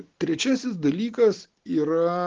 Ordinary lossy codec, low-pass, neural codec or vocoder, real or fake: Opus, 16 kbps; 7.2 kHz; none; real